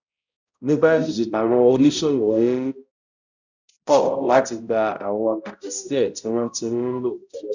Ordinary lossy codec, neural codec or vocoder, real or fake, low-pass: none; codec, 16 kHz, 0.5 kbps, X-Codec, HuBERT features, trained on balanced general audio; fake; 7.2 kHz